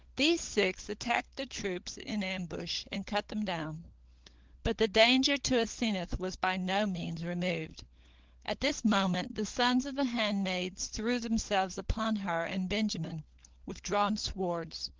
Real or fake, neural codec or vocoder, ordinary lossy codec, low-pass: fake; codec, 16 kHz, 16 kbps, FunCodec, trained on LibriTTS, 50 frames a second; Opus, 16 kbps; 7.2 kHz